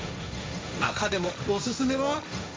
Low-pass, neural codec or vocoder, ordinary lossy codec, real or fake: none; codec, 16 kHz, 1.1 kbps, Voila-Tokenizer; none; fake